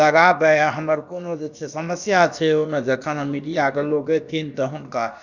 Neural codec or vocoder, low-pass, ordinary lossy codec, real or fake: codec, 16 kHz, about 1 kbps, DyCAST, with the encoder's durations; 7.2 kHz; none; fake